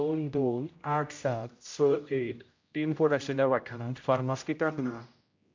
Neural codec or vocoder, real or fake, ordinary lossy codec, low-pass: codec, 16 kHz, 0.5 kbps, X-Codec, HuBERT features, trained on general audio; fake; MP3, 48 kbps; 7.2 kHz